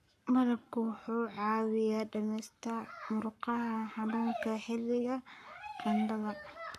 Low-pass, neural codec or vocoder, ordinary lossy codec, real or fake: 14.4 kHz; codec, 44.1 kHz, 7.8 kbps, Pupu-Codec; none; fake